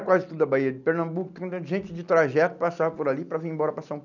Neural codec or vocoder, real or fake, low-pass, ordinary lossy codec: none; real; 7.2 kHz; none